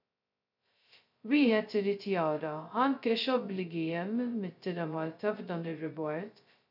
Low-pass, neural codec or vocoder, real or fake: 5.4 kHz; codec, 16 kHz, 0.2 kbps, FocalCodec; fake